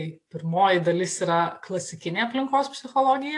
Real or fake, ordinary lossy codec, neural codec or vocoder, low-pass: real; AAC, 48 kbps; none; 10.8 kHz